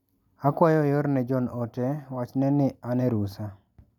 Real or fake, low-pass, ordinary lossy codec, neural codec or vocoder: real; 19.8 kHz; none; none